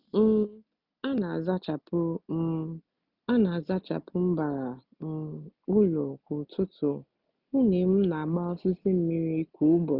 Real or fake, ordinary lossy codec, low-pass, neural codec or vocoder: real; none; 5.4 kHz; none